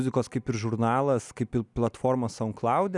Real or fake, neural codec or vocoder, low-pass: real; none; 10.8 kHz